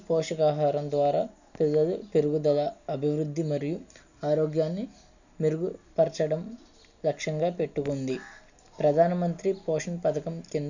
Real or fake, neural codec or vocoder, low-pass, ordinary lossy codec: real; none; 7.2 kHz; none